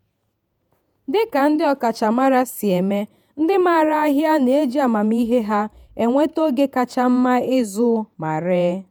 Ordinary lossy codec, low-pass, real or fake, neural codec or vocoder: none; none; fake; vocoder, 48 kHz, 128 mel bands, Vocos